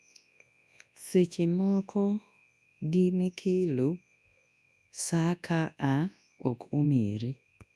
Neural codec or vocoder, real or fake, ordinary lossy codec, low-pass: codec, 24 kHz, 0.9 kbps, WavTokenizer, large speech release; fake; none; none